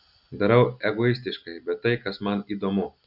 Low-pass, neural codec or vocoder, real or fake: 5.4 kHz; none; real